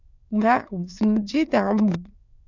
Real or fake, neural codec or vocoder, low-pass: fake; autoencoder, 22.05 kHz, a latent of 192 numbers a frame, VITS, trained on many speakers; 7.2 kHz